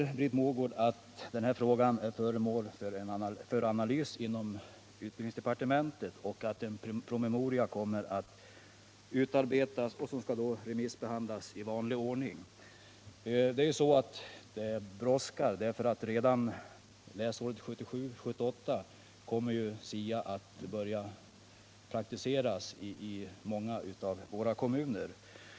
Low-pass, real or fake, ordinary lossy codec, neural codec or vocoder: none; real; none; none